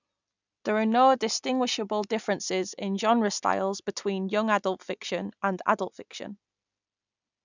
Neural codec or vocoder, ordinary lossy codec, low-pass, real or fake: none; none; 7.2 kHz; real